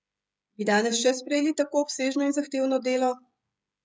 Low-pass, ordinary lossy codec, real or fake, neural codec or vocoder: none; none; fake; codec, 16 kHz, 16 kbps, FreqCodec, smaller model